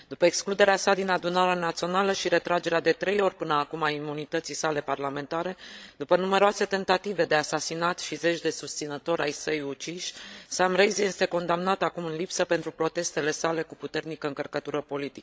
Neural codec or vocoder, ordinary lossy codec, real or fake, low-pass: codec, 16 kHz, 16 kbps, FreqCodec, larger model; none; fake; none